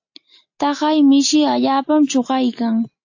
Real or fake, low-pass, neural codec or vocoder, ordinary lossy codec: real; 7.2 kHz; none; AAC, 48 kbps